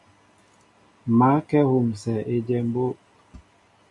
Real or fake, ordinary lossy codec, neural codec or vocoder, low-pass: real; AAC, 64 kbps; none; 10.8 kHz